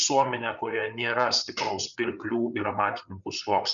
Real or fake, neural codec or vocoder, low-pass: fake; codec, 16 kHz, 8 kbps, FreqCodec, larger model; 7.2 kHz